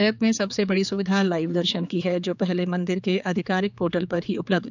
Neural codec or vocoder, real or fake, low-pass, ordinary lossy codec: codec, 16 kHz, 4 kbps, X-Codec, HuBERT features, trained on general audio; fake; 7.2 kHz; none